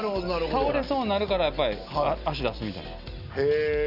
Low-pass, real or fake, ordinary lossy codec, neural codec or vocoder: 5.4 kHz; real; none; none